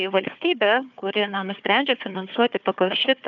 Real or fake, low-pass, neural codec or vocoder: fake; 7.2 kHz; codec, 16 kHz, 4 kbps, FunCodec, trained on Chinese and English, 50 frames a second